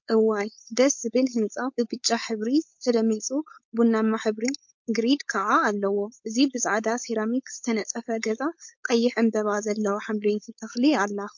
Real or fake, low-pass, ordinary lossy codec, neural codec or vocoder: fake; 7.2 kHz; MP3, 48 kbps; codec, 16 kHz, 4.8 kbps, FACodec